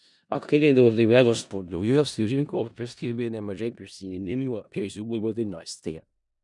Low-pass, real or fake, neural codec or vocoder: 10.8 kHz; fake; codec, 16 kHz in and 24 kHz out, 0.4 kbps, LongCat-Audio-Codec, four codebook decoder